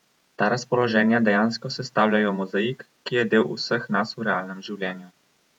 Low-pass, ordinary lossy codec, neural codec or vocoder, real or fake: 19.8 kHz; none; vocoder, 48 kHz, 128 mel bands, Vocos; fake